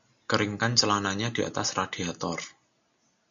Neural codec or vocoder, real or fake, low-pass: none; real; 7.2 kHz